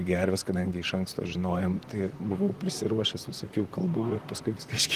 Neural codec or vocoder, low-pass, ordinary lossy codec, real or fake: vocoder, 44.1 kHz, 128 mel bands, Pupu-Vocoder; 14.4 kHz; Opus, 24 kbps; fake